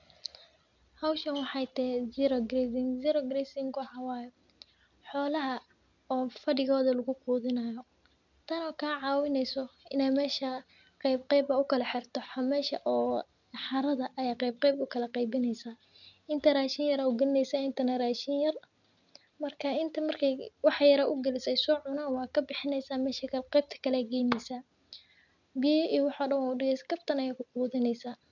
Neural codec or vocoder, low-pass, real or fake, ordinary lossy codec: none; 7.2 kHz; real; none